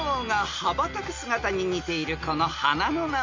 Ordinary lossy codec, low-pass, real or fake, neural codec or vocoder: MP3, 48 kbps; 7.2 kHz; real; none